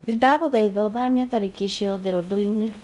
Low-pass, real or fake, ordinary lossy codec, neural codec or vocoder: 10.8 kHz; fake; none; codec, 16 kHz in and 24 kHz out, 0.6 kbps, FocalCodec, streaming, 2048 codes